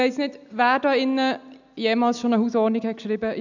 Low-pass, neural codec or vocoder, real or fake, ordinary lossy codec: 7.2 kHz; none; real; none